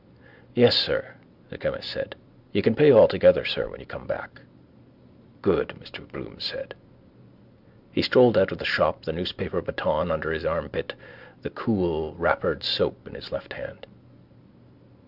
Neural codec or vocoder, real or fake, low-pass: none; real; 5.4 kHz